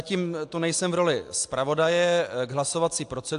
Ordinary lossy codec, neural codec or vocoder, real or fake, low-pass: AAC, 64 kbps; none; real; 10.8 kHz